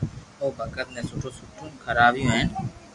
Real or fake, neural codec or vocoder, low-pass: real; none; 10.8 kHz